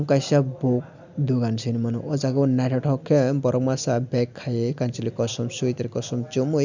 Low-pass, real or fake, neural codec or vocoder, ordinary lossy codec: 7.2 kHz; real; none; none